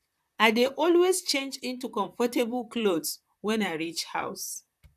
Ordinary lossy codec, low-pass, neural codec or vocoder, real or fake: none; 14.4 kHz; vocoder, 44.1 kHz, 128 mel bands, Pupu-Vocoder; fake